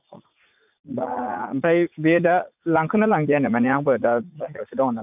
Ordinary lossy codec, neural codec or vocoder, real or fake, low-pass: none; vocoder, 44.1 kHz, 80 mel bands, Vocos; fake; 3.6 kHz